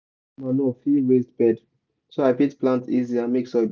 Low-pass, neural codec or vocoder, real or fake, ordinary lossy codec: none; none; real; none